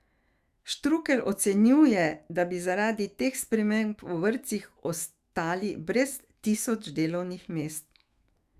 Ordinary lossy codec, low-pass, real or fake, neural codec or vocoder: Opus, 64 kbps; 14.4 kHz; fake; autoencoder, 48 kHz, 128 numbers a frame, DAC-VAE, trained on Japanese speech